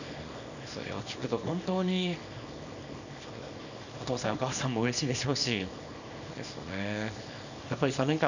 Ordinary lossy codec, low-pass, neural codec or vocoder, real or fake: none; 7.2 kHz; codec, 24 kHz, 0.9 kbps, WavTokenizer, small release; fake